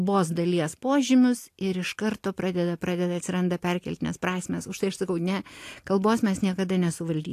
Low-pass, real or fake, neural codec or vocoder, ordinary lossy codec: 14.4 kHz; real; none; AAC, 64 kbps